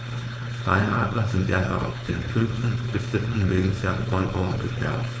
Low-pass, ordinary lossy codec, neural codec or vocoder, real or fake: none; none; codec, 16 kHz, 4.8 kbps, FACodec; fake